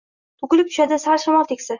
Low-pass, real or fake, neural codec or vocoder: 7.2 kHz; real; none